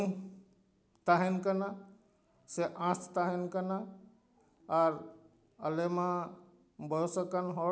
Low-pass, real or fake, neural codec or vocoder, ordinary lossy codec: none; real; none; none